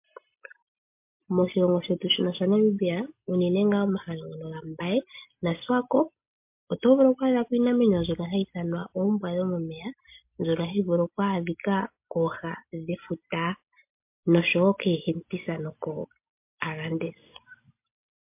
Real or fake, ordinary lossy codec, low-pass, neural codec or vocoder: real; MP3, 32 kbps; 3.6 kHz; none